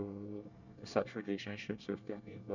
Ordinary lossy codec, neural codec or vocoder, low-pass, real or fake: none; codec, 24 kHz, 1 kbps, SNAC; 7.2 kHz; fake